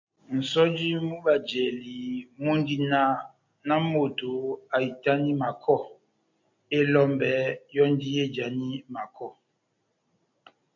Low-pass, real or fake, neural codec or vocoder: 7.2 kHz; real; none